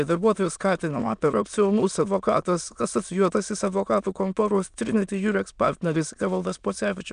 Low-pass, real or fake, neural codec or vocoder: 9.9 kHz; fake; autoencoder, 22.05 kHz, a latent of 192 numbers a frame, VITS, trained on many speakers